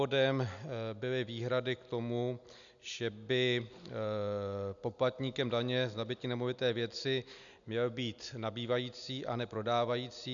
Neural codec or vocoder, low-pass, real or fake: none; 7.2 kHz; real